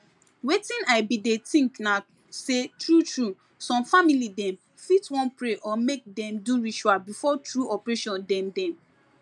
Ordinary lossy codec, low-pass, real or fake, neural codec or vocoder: MP3, 96 kbps; 10.8 kHz; real; none